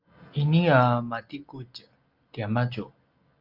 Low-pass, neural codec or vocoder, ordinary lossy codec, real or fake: 5.4 kHz; none; Opus, 32 kbps; real